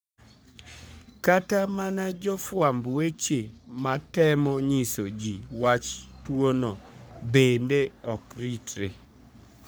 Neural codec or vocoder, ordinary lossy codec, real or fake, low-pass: codec, 44.1 kHz, 3.4 kbps, Pupu-Codec; none; fake; none